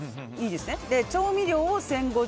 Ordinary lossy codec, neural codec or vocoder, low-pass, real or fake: none; none; none; real